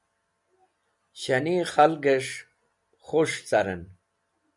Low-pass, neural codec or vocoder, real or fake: 10.8 kHz; none; real